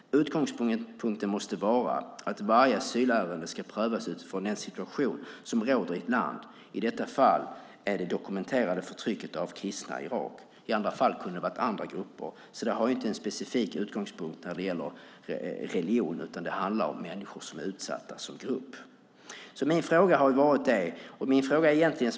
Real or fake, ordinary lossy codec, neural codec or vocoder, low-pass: real; none; none; none